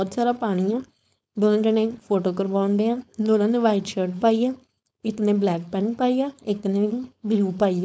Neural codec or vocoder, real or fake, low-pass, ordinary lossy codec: codec, 16 kHz, 4.8 kbps, FACodec; fake; none; none